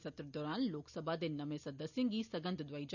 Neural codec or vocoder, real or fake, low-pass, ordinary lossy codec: none; real; 7.2 kHz; none